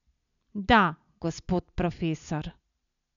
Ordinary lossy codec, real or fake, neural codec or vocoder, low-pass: none; real; none; 7.2 kHz